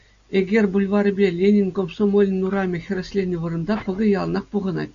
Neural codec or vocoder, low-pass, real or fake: none; 7.2 kHz; real